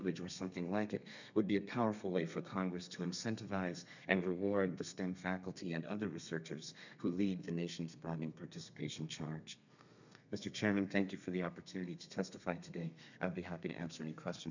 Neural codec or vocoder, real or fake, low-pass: codec, 32 kHz, 1.9 kbps, SNAC; fake; 7.2 kHz